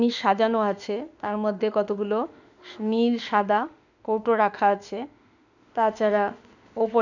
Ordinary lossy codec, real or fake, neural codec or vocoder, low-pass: none; fake; autoencoder, 48 kHz, 32 numbers a frame, DAC-VAE, trained on Japanese speech; 7.2 kHz